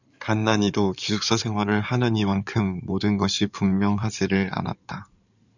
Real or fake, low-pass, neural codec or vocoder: fake; 7.2 kHz; codec, 16 kHz in and 24 kHz out, 2.2 kbps, FireRedTTS-2 codec